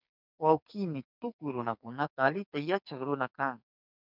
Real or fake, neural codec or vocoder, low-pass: fake; codec, 44.1 kHz, 2.6 kbps, SNAC; 5.4 kHz